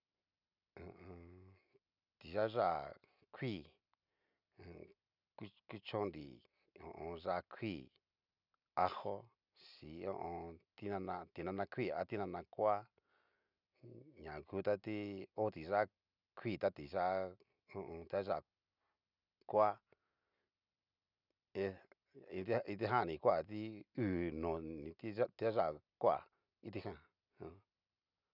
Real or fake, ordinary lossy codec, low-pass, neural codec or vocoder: real; none; 5.4 kHz; none